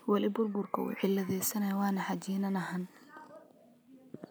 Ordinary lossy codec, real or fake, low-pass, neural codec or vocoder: none; real; none; none